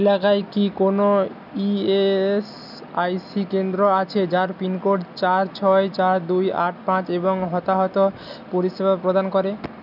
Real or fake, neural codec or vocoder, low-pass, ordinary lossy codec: real; none; 5.4 kHz; none